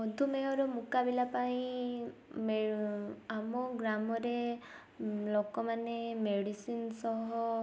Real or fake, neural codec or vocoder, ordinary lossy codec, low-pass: real; none; none; none